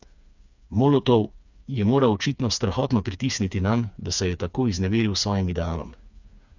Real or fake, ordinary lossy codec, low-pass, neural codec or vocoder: fake; none; 7.2 kHz; codec, 16 kHz, 4 kbps, FreqCodec, smaller model